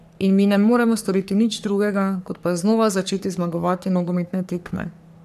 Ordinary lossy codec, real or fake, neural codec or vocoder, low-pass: none; fake; codec, 44.1 kHz, 3.4 kbps, Pupu-Codec; 14.4 kHz